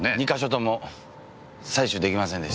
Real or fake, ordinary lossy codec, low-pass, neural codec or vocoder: real; none; none; none